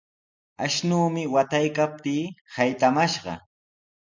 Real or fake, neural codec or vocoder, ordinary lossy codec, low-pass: real; none; MP3, 64 kbps; 7.2 kHz